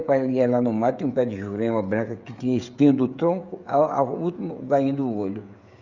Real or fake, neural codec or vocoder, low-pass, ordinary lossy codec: fake; codec, 16 kHz, 16 kbps, FreqCodec, smaller model; 7.2 kHz; Opus, 64 kbps